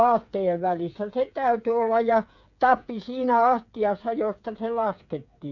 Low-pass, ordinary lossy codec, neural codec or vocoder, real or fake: 7.2 kHz; none; codec, 16 kHz, 8 kbps, FreqCodec, smaller model; fake